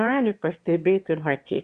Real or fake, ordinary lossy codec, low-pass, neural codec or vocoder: fake; Opus, 64 kbps; 9.9 kHz; autoencoder, 22.05 kHz, a latent of 192 numbers a frame, VITS, trained on one speaker